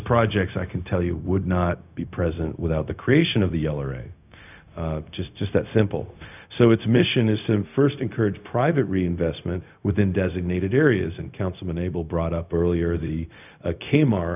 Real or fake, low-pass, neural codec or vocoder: fake; 3.6 kHz; codec, 16 kHz, 0.4 kbps, LongCat-Audio-Codec